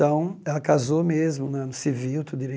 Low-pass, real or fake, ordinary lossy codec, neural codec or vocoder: none; real; none; none